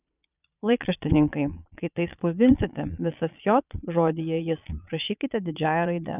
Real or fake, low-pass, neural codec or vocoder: fake; 3.6 kHz; vocoder, 22.05 kHz, 80 mel bands, Vocos